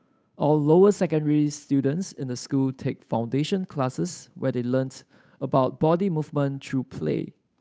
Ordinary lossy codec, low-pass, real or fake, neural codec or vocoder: none; none; fake; codec, 16 kHz, 8 kbps, FunCodec, trained on Chinese and English, 25 frames a second